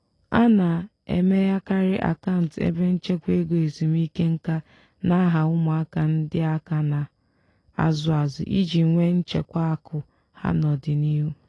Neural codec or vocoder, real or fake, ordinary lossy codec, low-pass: none; real; AAC, 32 kbps; 10.8 kHz